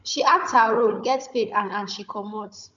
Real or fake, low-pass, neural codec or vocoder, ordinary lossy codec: fake; 7.2 kHz; codec, 16 kHz, 16 kbps, FunCodec, trained on Chinese and English, 50 frames a second; MP3, 64 kbps